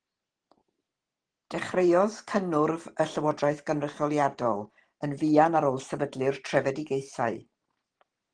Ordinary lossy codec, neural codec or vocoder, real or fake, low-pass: Opus, 16 kbps; vocoder, 44.1 kHz, 128 mel bands every 512 samples, BigVGAN v2; fake; 9.9 kHz